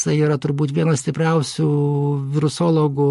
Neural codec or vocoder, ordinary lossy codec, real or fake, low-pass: none; MP3, 48 kbps; real; 14.4 kHz